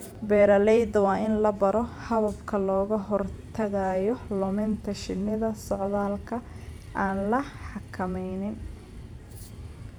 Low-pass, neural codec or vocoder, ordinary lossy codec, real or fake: 19.8 kHz; vocoder, 44.1 kHz, 128 mel bands every 512 samples, BigVGAN v2; none; fake